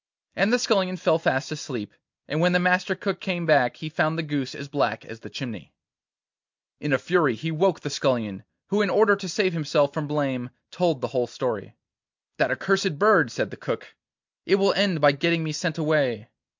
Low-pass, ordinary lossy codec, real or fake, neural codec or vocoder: 7.2 kHz; MP3, 64 kbps; real; none